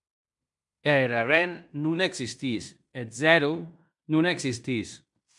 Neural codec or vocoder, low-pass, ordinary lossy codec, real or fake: codec, 16 kHz in and 24 kHz out, 0.9 kbps, LongCat-Audio-Codec, fine tuned four codebook decoder; 10.8 kHz; MP3, 96 kbps; fake